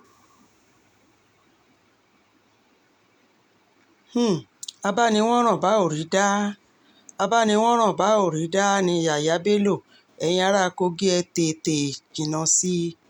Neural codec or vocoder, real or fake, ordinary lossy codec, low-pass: none; real; none; 19.8 kHz